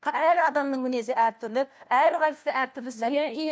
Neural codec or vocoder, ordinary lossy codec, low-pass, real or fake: codec, 16 kHz, 1 kbps, FunCodec, trained on LibriTTS, 50 frames a second; none; none; fake